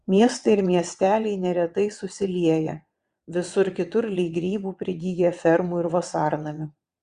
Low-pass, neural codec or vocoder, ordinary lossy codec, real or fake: 9.9 kHz; vocoder, 22.05 kHz, 80 mel bands, WaveNeXt; Opus, 64 kbps; fake